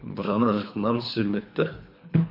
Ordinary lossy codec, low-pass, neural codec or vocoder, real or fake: MP3, 32 kbps; 5.4 kHz; codec, 24 kHz, 3 kbps, HILCodec; fake